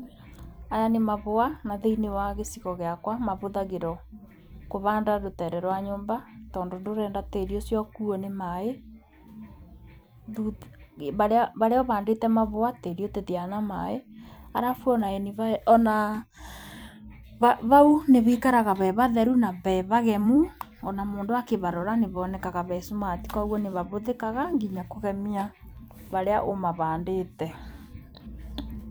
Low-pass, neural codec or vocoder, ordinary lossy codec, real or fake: none; vocoder, 44.1 kHz, 128 mel bands every 256 samples, BigVGAN v2; none; fake